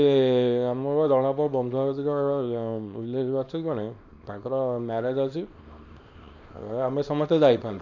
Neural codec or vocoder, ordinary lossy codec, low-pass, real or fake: codec, 24 kHz, 0.9 kbps, WavTokenizer, small release; none; 7.2 kHz; fake